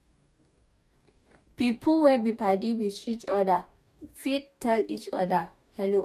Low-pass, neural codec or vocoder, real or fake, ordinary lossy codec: 14.4 kHz; codec, 44.1 kHz, 2.6 kbps, DAC; fake; none